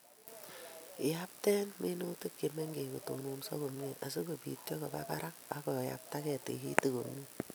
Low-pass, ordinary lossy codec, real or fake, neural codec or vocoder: none; none; real; none